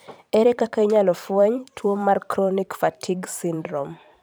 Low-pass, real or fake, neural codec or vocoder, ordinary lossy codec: none; fake; vocoder, 44.1 kHz, 128 mel bands every 512 samples, BigVGAN v2; none